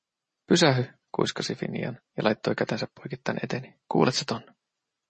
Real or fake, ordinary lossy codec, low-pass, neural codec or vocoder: real; MP3, 32 kbps; 10.8 kHz; none